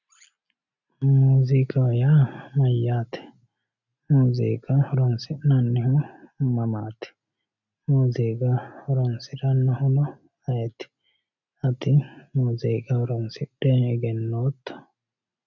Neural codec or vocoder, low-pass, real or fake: none; 7.2 kHz; real